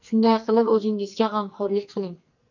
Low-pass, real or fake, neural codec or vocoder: 7.2 kHz; fake; codec, 44.1 kHz, 2.6 kbps, SNAC